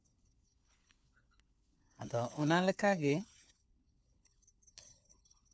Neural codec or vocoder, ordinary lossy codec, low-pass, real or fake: codec, 16 kHz, 4 kbps, FunCodec, trained on LibriTTS, 50 frames a second; none; none; fake